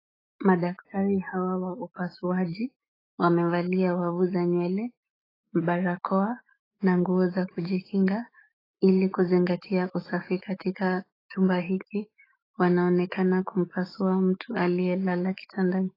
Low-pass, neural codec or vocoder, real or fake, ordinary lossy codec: 5.4 kHz; autoencoder, 48 kHz, 128 numbers a frame, DAC-VAE, trained on Japanese speech; fake; AAC, 24 kbps